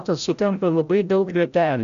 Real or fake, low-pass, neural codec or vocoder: fake; 7.2 kHz; codec, 16 kHz, 0.5 kbps, FreqCodec, larger model